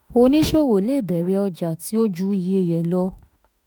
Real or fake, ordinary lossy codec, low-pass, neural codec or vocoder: fake; none; none; autoencoder, 48 kHz, 32 numbers a frame, DAC-VAE, trained on Japanese speech